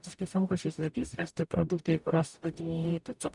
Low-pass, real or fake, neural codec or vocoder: 10.8 kHz; fake; codec, 44.1 kHz, 0.9 kbps, DAC